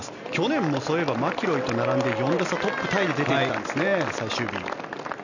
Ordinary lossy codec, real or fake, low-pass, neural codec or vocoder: none; real; 7.2 kHz; none